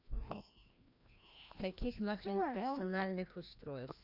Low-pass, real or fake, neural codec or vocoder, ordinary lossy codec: 5.4 kHz; fake; codec, 16 kHz, 1 kbps, FreqCodec, larger model; none